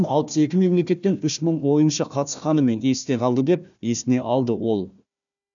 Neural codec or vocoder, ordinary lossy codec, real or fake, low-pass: codec, 16 kHz, 1 kbps, FunCodec, trained on Chinese and English, 50 frames a second; none; fake; 7.2 kHz